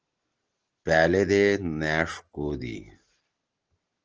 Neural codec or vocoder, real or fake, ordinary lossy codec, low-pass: none; real; Opus, 16 kbps; 7.2 kHz